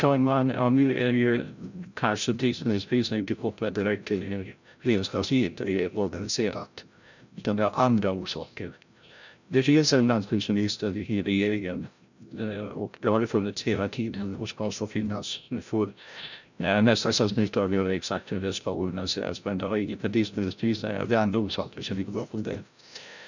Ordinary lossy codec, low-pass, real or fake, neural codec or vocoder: none; 7.2 kHz; fake; codec, 16 kHz, 0.5 kbps, FreqCodec, larger model